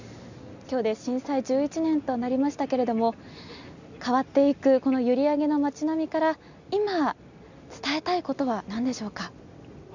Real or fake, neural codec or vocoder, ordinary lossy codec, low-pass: real; none; none; 7.2 kHz